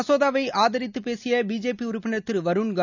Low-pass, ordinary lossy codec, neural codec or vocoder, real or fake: 7.2 kHz; none; none; real